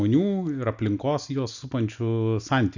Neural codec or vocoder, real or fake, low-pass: none; real; 7.2 kHz